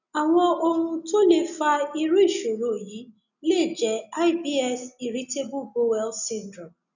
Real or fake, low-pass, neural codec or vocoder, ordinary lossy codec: real; 7.2 kHz; none; none